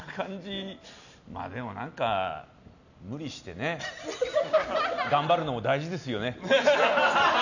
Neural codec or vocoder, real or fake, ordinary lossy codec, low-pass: none; real; none; 7.2 kHz